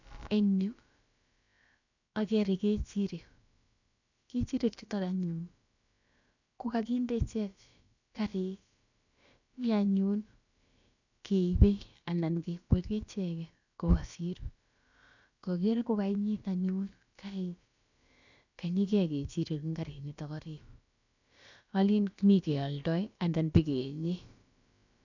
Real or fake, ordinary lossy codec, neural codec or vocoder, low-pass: fake; none; codec, 16 kHz, about 1 kbps, DyCAST, with the encoder's durations; 7.2 kHz